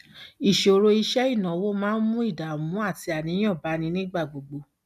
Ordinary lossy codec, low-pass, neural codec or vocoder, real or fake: none; 14.4 kHz; none; real